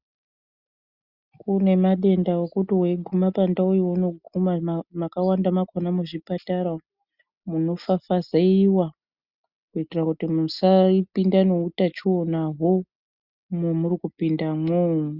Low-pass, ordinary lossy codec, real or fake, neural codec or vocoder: 5.4 kHz; Opus, 64 kbps; real; none